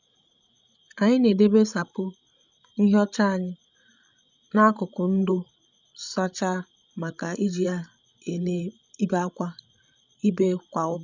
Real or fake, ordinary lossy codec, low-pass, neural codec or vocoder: fake; none; 7.2 kHz; codec, 16 kHz, 16 kbps, FreqCodec, larger model